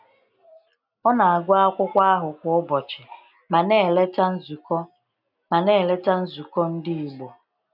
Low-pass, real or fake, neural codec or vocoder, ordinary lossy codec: 5.4 kHz; real; none; none